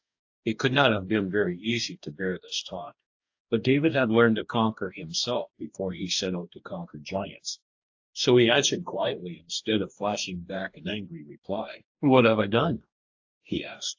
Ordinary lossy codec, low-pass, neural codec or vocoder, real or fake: AAC, 48 kbps; 7.2 kHz; codec, 44.1 kHz, 2.6 kbps, DAC; fake